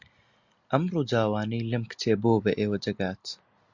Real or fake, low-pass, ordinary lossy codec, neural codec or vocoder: real; 7.2 kHz; Opus, 64 kbps; none